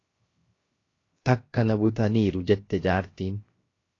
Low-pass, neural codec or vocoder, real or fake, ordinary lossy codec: 7.2 kHz; codec, 16 kHz, 0.7 kbps, FocalCodec; fake; AAC, 32 kbps